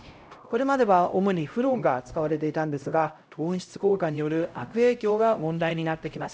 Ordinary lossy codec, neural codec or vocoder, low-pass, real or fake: none; codec, 16 kHz, 0.5 kbps, X-Codec, HuBERT features, trained on LibriSpeech; none; fake